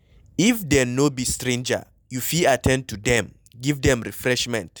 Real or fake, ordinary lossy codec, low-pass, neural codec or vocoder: real; none; none; none